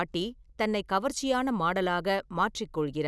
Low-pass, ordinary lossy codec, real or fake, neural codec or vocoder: none; none; real; none